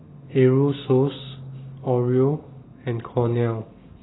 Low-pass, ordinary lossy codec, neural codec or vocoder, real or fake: 7.2 kHz; AAC, 16 kbps; none; real